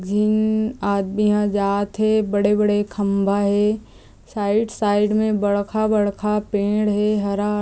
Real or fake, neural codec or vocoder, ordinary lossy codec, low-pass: real; none; none; none